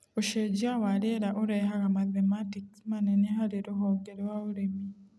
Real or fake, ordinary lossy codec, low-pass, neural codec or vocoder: real; none; none; none